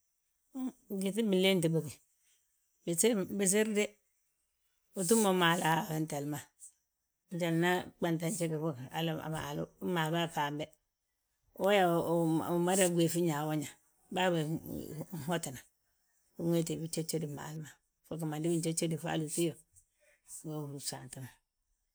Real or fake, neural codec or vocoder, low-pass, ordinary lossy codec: real; none; none; none